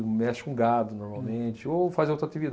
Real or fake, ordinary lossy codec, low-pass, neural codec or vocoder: real; none; none; none